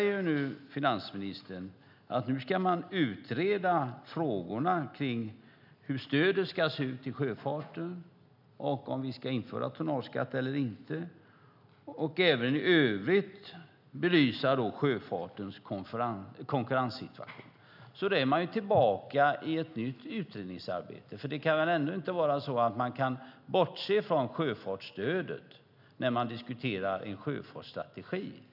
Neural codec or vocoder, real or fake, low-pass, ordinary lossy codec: none; real; 5.4 kHz; none